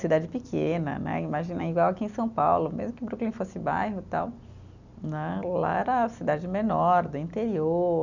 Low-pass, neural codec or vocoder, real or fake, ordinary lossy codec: 7.2 kHz; none; real; none